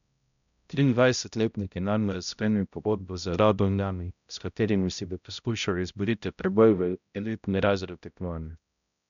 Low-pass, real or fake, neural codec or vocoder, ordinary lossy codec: 7.2 kHz; fake; codec, 16 kHz, 0.5 kbps, X-Codec, HuBERT features, trained on balanced general audio; none